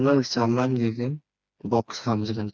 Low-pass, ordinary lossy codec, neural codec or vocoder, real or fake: none; none; codec, 16 kHz, 2 kbps, FreqCodec, smaller model; fake